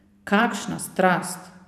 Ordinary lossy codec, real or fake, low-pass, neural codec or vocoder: none; fake; 14.4 kHz; vocoder, 44.1 kHz, 128 mel bands every 512 samples, BigVGAN v2